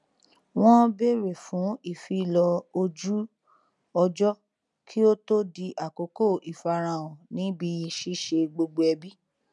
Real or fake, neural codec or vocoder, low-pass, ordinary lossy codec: real; none; 10.8 kHz; none